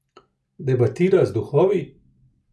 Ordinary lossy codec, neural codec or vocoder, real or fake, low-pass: none; none; real; none